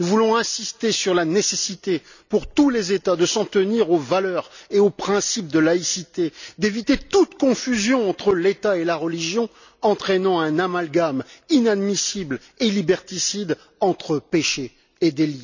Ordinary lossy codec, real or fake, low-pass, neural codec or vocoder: none; real; 7.2 kHz; none